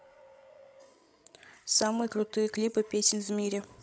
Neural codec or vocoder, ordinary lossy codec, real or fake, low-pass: codec, 16 kHz, 16 kbps, FreqCodec, larger model; none; fake; none